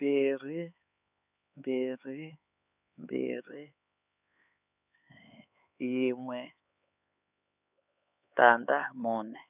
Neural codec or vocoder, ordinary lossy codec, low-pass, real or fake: codec, 16 kHz, 4 kbps, X-Codec, HuBERT features, trained on LibriSpeech; none; 3.6 kHz; fake